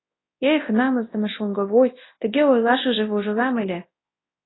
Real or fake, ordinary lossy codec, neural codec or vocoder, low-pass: fake; AAC, 16 kbps; codec, 24 kHz, 0.9 kbps, WavTokenizer, large speech release; 7.2 kHz